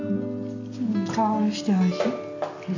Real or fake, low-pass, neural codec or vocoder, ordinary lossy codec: real; 7.2 kHz; none; MP3, 64 kbps